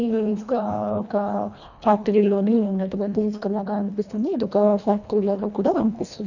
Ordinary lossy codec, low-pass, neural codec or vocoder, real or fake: none; 7.2 kHz; codec, 24 kHz, 1.5 kbps, HILCodec; fake